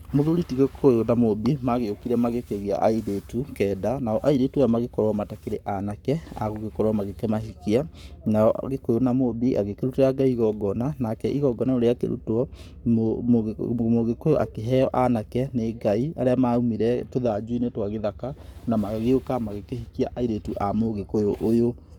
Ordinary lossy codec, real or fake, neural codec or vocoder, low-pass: none; fake; codec, 44.1 kHz, 7.8 kbps, Pupu-Codec; 19.8 kHz